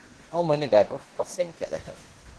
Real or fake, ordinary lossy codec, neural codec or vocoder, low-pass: fake; Opus, 16 kbps; codec, 16 kHz in and 24 kHz out, 0.9 kbps, LongCat-Audio-Codec, four codebook decoder; 10.8 kHz